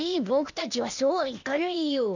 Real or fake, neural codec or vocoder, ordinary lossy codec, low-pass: fake; codec, 16 kHz, 0.8 kbps, ZipCodec; none; 7.2 kHz